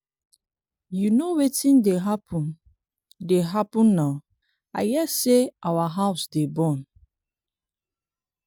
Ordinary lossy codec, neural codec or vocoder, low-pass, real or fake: none; none; none; real